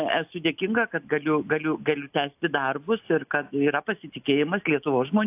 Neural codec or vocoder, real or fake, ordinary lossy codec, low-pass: none; real; AAC, 32 kbps; 3.6 kHz